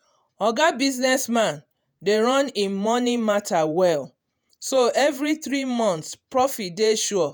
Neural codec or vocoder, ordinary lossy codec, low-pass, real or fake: vocoder, 48 kHz, 128 mel bands, Vocos; none; none; fake